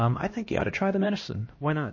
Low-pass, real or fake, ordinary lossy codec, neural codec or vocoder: 7.2 kHz; fake; MP3, 32 kbps; codec, 16 kHz, 1 kbps, X-Codec, HuBERT features, trained on LibriSpeech